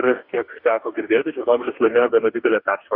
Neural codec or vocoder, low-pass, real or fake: codec, 44.1 kHz, 2.6 kbps, DAC; 5.4 kHz; fake